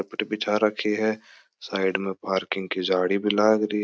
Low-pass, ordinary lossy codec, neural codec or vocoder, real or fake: none; none; none; real